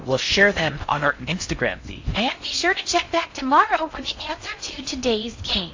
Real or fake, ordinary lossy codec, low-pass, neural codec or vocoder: fake; AAC, 48 kbps; 7.2 kHz; codec, 16 kHz in and 24 kHz out, 0.6 kbps, FocalCodec, streaming, 4096 codes